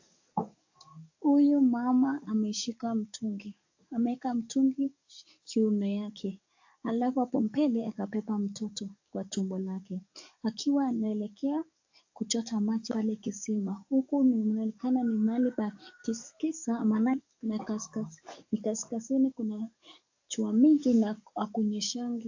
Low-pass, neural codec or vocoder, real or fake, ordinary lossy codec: 7.2 kHz; codec, 44.1 kHz, 7.8 kbps, DAC; fake; AAC, 48 kbps